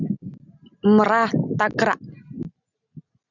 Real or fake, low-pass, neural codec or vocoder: real; 7.2 kHz; none